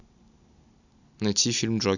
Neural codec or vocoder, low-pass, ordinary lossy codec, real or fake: none; 7.2 kHz; none; real